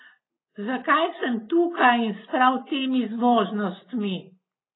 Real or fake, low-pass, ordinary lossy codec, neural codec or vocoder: real; 7.2 kHz; AAC, 16 kbps; none